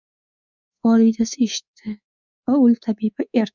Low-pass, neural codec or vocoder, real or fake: 7.2 kHz; codec, 24 kHz, 3.1 kbps, DualCodec; fake